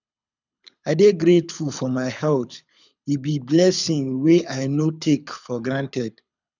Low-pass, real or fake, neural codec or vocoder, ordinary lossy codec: 7.2 kHz; fake; codec, 24 kHz, 6 kbps, HILCodec; none